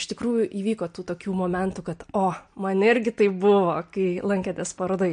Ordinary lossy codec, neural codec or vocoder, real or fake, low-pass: MP3, 48 kbps; none; real; 9.9 kHz